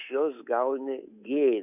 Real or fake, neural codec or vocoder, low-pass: fake; codec, 24 kHz, 3.1 kbps, DualCodec; 3.6 kHz